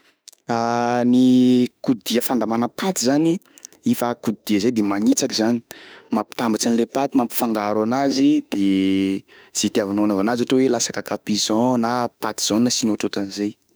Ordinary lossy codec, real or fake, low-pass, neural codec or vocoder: none; fake; none; autoencoder, 48 kHz, 32 numbers a frame, DAC-VAE, trained on Japanese speech